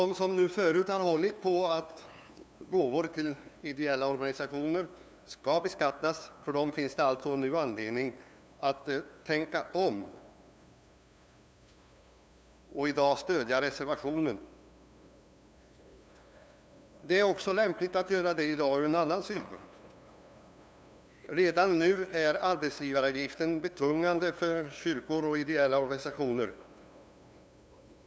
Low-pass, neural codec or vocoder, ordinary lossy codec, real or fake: none; codec, 16 kHz, 2 kbps, FunCodec, trained on LibriTTS, 25 frames a second; none; fake